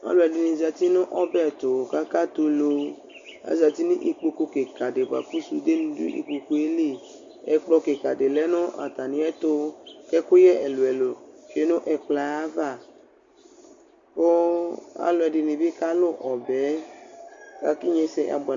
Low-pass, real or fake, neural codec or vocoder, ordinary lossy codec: 7.2 kHz; real; none; Opus, 64 kbps